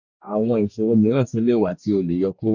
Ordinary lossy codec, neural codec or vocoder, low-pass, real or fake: none; codec, 44.1 kHz, 2.6 kbps, DAC; 7.2 kHz; fake